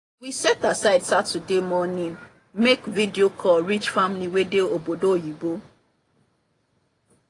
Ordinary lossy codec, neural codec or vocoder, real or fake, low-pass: AAC, 32 kbps; none; real; 10.8 kHz